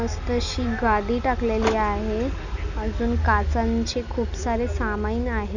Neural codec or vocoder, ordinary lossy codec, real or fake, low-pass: none; none; real; 7.2 kHz